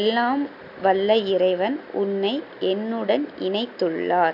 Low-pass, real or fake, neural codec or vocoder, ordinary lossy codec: 5.4 kHz; real; none; none